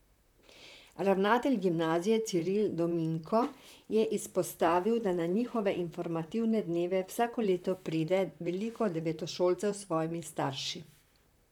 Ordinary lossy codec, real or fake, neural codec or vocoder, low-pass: none; fake; vocoder, 44.1 kHz, 128 mel bands, Pupu-Vocoder; 19.8 kHz